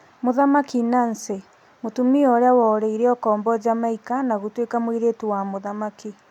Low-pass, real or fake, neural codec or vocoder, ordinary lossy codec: 19.8 kHz; real; none; none